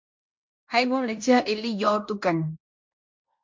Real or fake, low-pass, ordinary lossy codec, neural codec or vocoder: fake; 7.2 kHz; MP3, 48 kbps; codec, 16 kHz in and 24 kHz out, 0.9 kbps, LongCat-Audio-Codec, fine tuned four codebook decoder